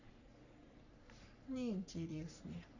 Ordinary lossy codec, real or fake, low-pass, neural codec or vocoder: none; fake; 7.2 kHz; codec, 44.1 kHz, 3.4 kbps, Pupu-Codec